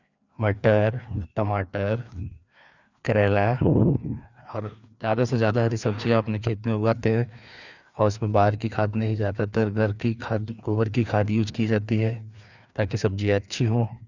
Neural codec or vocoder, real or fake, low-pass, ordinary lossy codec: codec, 16 kHz, 2 kbps, FreqCodec, larger model; fake; 7.2 kHz; none